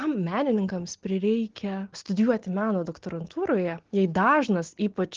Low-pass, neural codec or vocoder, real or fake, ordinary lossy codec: 7.2 kHz; none; real; Opus, 16 kbps